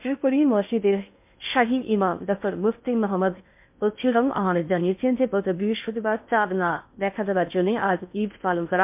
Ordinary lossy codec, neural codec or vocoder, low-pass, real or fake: MP3, 32 kbps; codec, 16 kHz in and 24 kHz out, 0.6 kbps, FocalCodec, streaming, 2048 codes; 3.6 kHz; fake